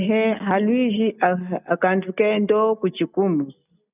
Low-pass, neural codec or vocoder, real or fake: 3.6 kHz; none; real